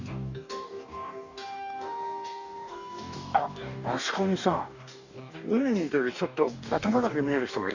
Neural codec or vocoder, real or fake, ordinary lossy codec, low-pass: codec, 44.1 kHz, 2.6 kbps, DAC; fake; none; 7.2 kHz